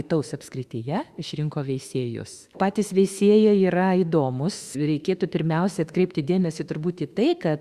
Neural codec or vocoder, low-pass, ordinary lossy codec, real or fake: autoencoder, 48 kHz, 32 numbers a frame, DAC-VAE, trained on Japanese speech; 14.4 kHz; Opus, 64 kbps; fake